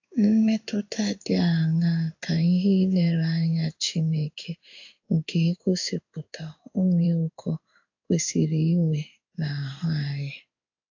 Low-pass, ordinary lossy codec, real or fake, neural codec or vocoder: 7.2 kHz; AAC, 48 kbps; fake; codec, 16 kHz in and 24 kHz out, 1 kbps, XY-Tokenizer